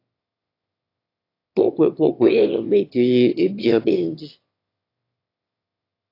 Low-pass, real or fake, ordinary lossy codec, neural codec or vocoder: 5.4 kHz; fake; AAC, 32 kbps; autoencoder, 22.05 kHz, a latent of 192 numbers a frame, VITS, trained on one speaker